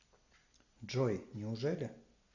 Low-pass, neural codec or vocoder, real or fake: 7.2 kHz; none; real